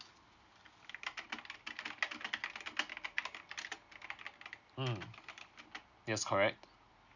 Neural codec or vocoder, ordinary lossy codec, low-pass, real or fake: none; none; 7.2 kHz; real